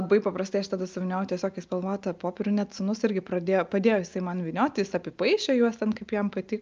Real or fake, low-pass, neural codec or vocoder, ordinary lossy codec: real; 7.2 kHz; none; Opus, 24 kbps